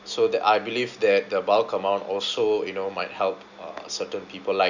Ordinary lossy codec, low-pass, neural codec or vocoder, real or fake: none; 7.2 kHz; none; real